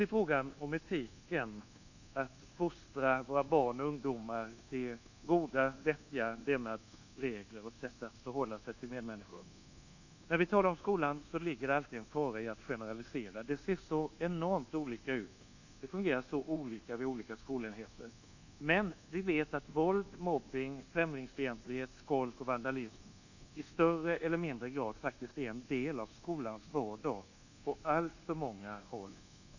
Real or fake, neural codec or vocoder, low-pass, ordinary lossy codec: fake; codec, 24 kHz, 1.2 kbps, DualCodec; 7.2 kHz; none